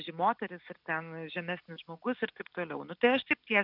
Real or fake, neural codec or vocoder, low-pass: real; none; 5.4 kHz